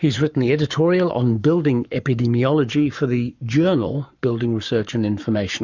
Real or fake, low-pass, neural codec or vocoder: fake; 7.2 kHz; codec, 44.1 kHz, 7.8 kbps, Pupu-Codec